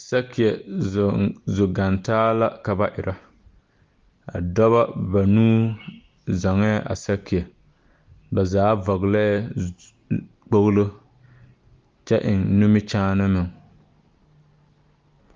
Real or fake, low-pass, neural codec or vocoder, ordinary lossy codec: real; 7.2 kHz; none; Opus, 32 kbps